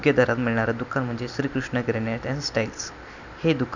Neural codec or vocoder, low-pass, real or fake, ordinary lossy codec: none; 7.2 kHz; real; none